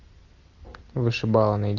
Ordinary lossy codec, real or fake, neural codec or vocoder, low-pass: Opus, 64 kbps; real; none; 7.2 kHz